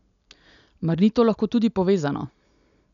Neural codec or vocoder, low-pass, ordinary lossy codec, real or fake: none; 7.2 kHz; none; real